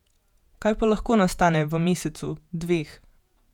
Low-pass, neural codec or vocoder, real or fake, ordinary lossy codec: 19.8 kHz; vocoder, 48 kHz, 128 mel bands, Vocos; fake; none